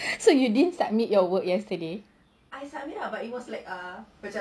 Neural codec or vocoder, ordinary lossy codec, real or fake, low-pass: none; none; real; none